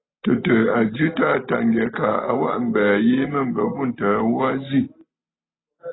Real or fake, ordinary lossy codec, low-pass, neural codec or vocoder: real; AAC, 16 kbps; 7.2 kHz; none